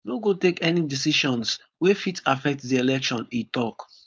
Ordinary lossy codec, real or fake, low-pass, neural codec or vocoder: none; fake; none; codec, 16 kHz, 4.8 kbps, FACodec